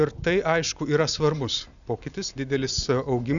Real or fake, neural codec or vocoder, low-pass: real; none; 7.2 kHz